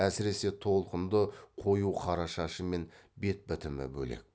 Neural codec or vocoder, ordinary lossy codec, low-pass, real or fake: none; none; none; real